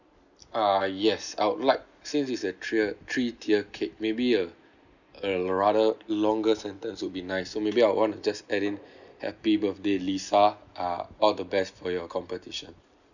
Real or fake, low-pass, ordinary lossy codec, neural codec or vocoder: real; 7.2 kHz; none; none